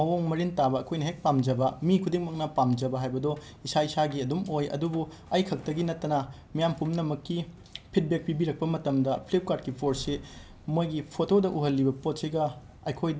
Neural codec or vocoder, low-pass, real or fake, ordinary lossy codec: none; none; real; none